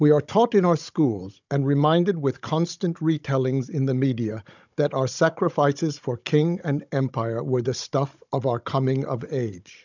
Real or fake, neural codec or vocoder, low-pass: fake; codec, 16 kHz, 16 kbps, FunCodec, trained on Chinese and English, 50 frames a second; 7.2 kHz